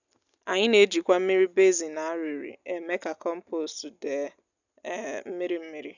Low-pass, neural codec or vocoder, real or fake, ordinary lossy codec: 7.2 kHz; none; real; none